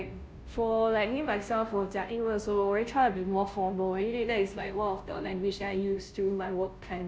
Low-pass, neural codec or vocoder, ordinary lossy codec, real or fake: none; codec, 16 kHz, 0.5 kbps, FunCodec, trained on Chinese and English, 25 frames a second; none; fake